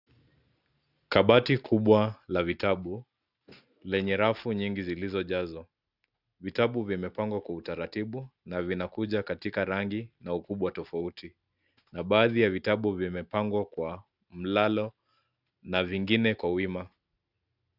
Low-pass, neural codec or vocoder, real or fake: 5.4 kHz; none; real